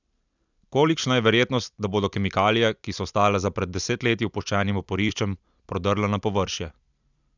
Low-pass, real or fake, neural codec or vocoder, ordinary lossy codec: 7.2 kHz; fake; vocoder, 44.1 kHz, 128 mel bands every 256 samples, BigVGAN v2; none